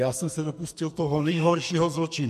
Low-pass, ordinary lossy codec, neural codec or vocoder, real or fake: 14.4 kHz; MP3, 64 kbps; codec, 44.1 kHz, 2.6 kbps, SNAC; fake